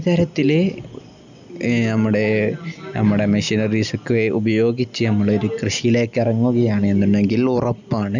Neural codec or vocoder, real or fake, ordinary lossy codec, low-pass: none; real; none; 7.2 kHz